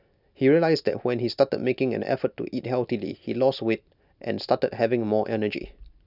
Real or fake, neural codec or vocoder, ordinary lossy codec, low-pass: real; none; none; 5.4 kHz